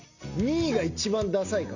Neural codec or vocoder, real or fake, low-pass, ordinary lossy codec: none; real; 7.2 kHz; none